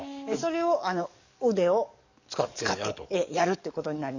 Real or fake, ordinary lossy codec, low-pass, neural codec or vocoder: fake; none; 7.2 kHz; codec, 44.1 kHz, 7.8 kbps, DAC